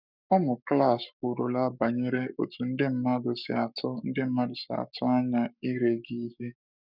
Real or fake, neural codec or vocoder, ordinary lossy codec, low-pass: fake; codec, 44.1 kHz, 7.8 kbps, Pupu-Codec; none; 5.4 kHz